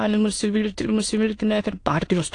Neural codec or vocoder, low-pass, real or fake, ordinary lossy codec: autoencoder, 22.05 kHz, a latent of 192 numbers a frame, VITS, trained on many speakers; 9.9 kHz; fake; AAC, 48 kbps